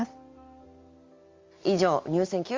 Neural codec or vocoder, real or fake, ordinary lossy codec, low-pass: none; real; Opus, 32 kbps; 7.2 kHz